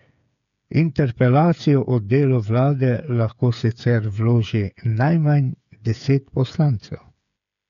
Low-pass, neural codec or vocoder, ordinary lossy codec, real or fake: 7.2 kHz; codec, 16 kHz, 8 kbps, FreqCodec, smaller model; none; fake